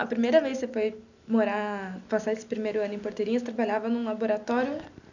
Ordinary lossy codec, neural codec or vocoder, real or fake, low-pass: none; none; real; 7.2 kHz